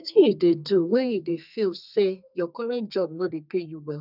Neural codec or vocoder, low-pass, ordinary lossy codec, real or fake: codec, 32 kHz, 1.9 kbps, SNAC; 5.4 kHz; none; fake